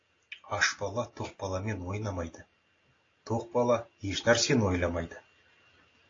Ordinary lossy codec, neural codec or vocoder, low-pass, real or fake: AAC, 32 kbps; none; 7.2 kHz; real